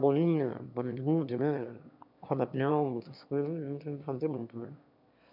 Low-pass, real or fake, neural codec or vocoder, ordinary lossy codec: 5.4 kHz; fake; autoencoder, 22.05 kHz, a latent of 192 numbers a frame, VITS, trained on one speaker; none